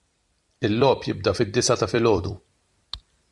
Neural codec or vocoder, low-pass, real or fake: vocoder, 44.1 kHz, 128 mel bands every 256 samples, BigVGAN v2; 10.8 kHz; fake